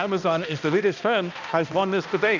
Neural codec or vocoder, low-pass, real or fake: codec, 16 kHz, 1 kbps, X-Codec, HuBERT features, trained on balanced general audio; 7.2 kHz; fake